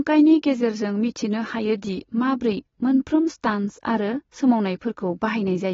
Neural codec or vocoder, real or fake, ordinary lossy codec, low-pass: none; real; AAC, 24 kbps; 7.2 kHz